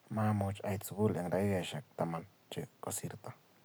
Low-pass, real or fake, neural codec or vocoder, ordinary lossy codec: none; real; none; none